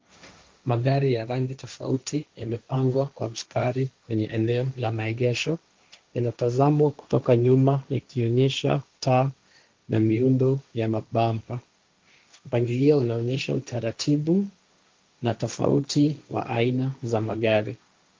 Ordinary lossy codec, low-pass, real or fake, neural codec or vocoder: Opus, 32 kbps; 7.2 kHz; fake; codec, 16 kHz, 1.1 kbps, Voila-Tokenizer